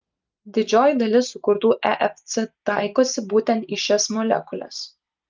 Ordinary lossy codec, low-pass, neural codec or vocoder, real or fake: Opus, 24 kbps; 7.2 kHz; none; real